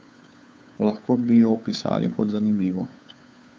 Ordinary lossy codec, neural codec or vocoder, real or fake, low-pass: none; codec, 16 kHz, 2 kbps, FunCodec, trained on Chinese and English, 25 frames a second; fake; none